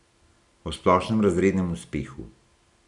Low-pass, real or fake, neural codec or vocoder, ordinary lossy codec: 10.8 kHz; real; none; none